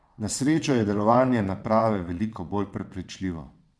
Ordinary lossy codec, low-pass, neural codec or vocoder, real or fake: none; none; vocoder, 22.05 kHz, 80 mel bands, WaveNeXt; fake